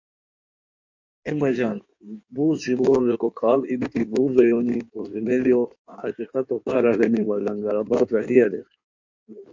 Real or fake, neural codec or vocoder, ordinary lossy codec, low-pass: fake; codec, 16 kHz in and 24 kHz out, 1.1 kbps, FireRedTTS-2 codec; MP3, 48 kbps; 7.2 kHz